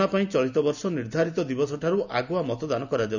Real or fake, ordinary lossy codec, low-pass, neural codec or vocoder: real; none; 7.2 kHz; none